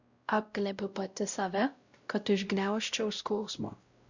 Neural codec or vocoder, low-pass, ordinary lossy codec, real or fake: codec, 16 kHz, 0.5 kbps, X-Codec, WavLM features, trained on Multilingual LibriSpeech; 7.2 kHz; Opus, 64 kbps; fake